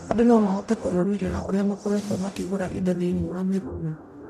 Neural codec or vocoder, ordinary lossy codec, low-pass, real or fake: codec, 44.1 kHz, 0.9 kbps, DAC; none; 14.4 kHz; fake